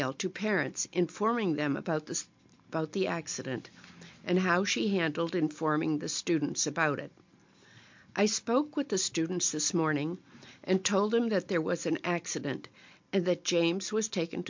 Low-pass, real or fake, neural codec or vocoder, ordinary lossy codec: 7.2 kHz; real; none; MP3, 48 kbps